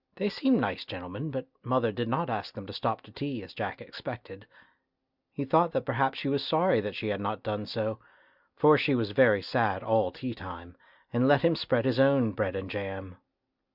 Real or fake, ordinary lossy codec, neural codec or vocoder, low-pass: real; Opus, 64 kbps; none; 5.4 kHz